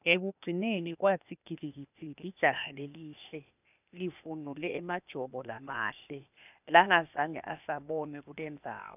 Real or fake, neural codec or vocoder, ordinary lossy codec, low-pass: fake; codec, 16 kHz, 0.8 kbps, ZipCodec; none; 3.6 kHz